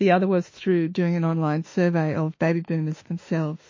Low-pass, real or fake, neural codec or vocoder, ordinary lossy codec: 7.2 kHz; fake; autoencoder, 48 kHz, 32 numbers a frame, DAC-VAE, trained on Japanese speech; MP3, 32 kbps